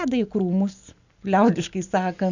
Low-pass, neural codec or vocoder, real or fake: 7.2 kHz; none; real